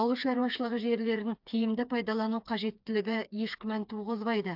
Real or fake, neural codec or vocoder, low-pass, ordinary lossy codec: fake; codec, 16 kHz, 4 kbps, FreqCodec, smaller model; 5.4 kHz; AAC, 48 kbps